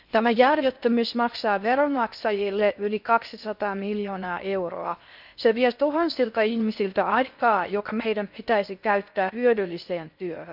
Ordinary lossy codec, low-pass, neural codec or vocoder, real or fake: none; 5.4 kHz; codec, 16 kHz in and 24 kHz out, 0.6 kbps, FocalCodec, streaming, 2048 codes; fake